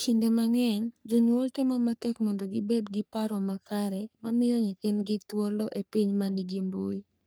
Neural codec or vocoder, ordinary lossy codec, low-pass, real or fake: codec, 44.1 kHz, 3.4 kbps, Pupu-Codec; none; none; fake